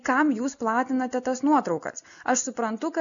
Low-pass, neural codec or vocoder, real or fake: 7.2 kHz; none; real